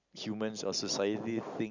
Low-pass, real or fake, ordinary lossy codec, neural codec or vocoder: 7.2 kHz; real; Opus, 64 kbps; none